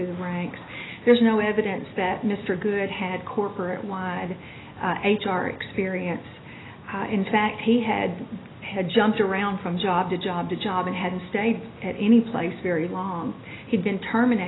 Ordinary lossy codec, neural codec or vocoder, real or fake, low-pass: AAC, 16 kbps; none; real; 7.2 kHz